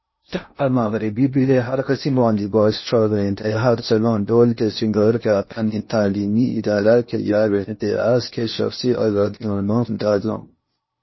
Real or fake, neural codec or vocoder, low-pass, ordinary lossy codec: fake; codec, 16 kHz in and 24 kHz out, 0.6 kbps, FocalCodec, streaming, 2048 codes; 7.2 kHz; MP3, 24 kbps